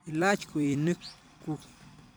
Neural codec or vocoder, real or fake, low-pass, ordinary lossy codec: vocoder, 44.1 kHz, 128 mel bands every 256 samples, BigVGAN v2; fake; none; none